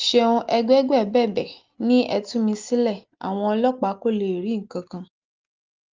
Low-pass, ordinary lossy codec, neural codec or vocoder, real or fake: 7.2 kHz; Opus, 32 kbps; none; real